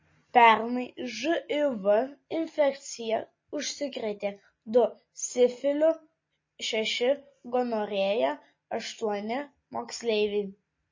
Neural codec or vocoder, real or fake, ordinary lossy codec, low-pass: none; real; MP3, 32 kbps; 7.2 kHz